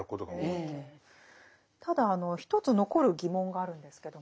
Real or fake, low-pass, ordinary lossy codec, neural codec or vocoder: real; none; none; none